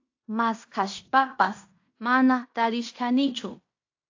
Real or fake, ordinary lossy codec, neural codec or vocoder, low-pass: fake; AAC, 48 kbps; codec, 16 kHz in and 24 kHz out, 0.9 kbps, LongCat-Audio-Codec, fine tuned four codebook decoder; 7.2 kHz